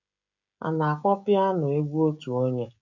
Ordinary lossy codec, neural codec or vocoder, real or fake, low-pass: none; codec, 16 kHz, 16 kbps, FreqCodec, smaller model; fake; 7.2 kHz